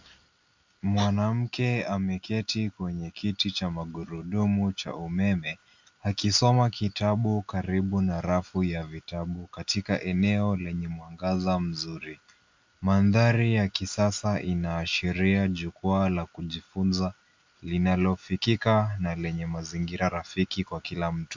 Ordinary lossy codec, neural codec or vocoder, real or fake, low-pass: MP3, 64 kbps; none; real; 7.2 kHz